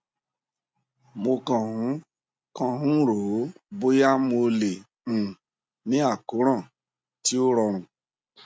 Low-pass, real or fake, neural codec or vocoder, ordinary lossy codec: none; real; none; none